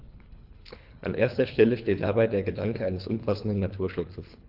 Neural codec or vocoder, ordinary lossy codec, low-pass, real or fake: codec, 24 kHz, 3 kbps, HILCodec; Opus, 32 kbps; 5.4 kHz; fake